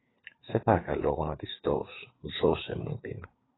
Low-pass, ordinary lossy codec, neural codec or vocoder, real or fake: 7.2 kHz; AAC, 16 kbps; codec, 16 kHz, 4 kbps, FreqCodec, larger model; fake